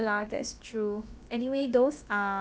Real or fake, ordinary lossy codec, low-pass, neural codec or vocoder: fake; none; none; codec, 16 kHz, about 1 kbps, DyCAST, with the encoder's durations